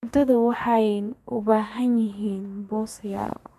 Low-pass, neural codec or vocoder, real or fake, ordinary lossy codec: 14.4 kHz; codec, 32 kHz, 1.9 kbps, SNAC; fake; MP3, 96 kbps